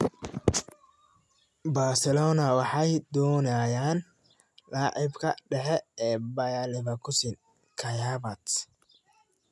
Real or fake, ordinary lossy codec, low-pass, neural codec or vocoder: real; none; none; none